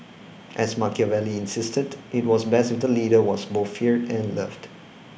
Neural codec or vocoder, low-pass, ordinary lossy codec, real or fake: none; none; none; real